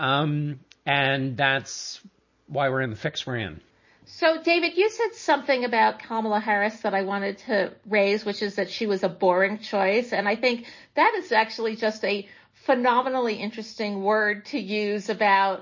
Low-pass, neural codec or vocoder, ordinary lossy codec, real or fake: 7.2 kHz; none; MP3, 32 kbps; real